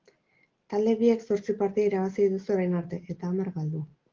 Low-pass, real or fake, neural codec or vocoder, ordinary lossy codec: 7.2 kHz; real; none; Opus, 16 kbps